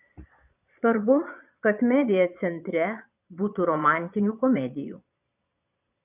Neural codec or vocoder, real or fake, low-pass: vocoder, 22.05 kHz, 80 mel bands, Vocos; fake; 3.6 kHz